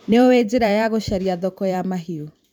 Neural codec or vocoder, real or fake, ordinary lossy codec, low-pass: vocoder, 44.1 kHz, 128 mel bands every 512 samples, BigVGAN v2; fake; none; 19.8 kHz